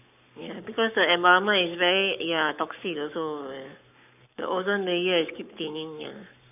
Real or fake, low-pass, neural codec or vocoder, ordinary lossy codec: fake; 3.6 kHz; codec, 44.1 kHz, 7.8 kbps, Pupu-Codec; none